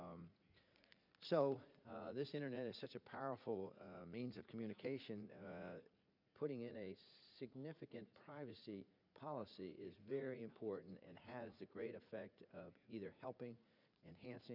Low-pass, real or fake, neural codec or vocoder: 5.4 kHz; fake; vocoder, 44.1 kHz, 80 mel bands, Vocos